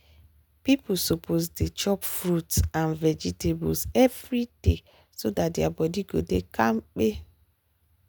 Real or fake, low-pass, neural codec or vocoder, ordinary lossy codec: real; none; none; none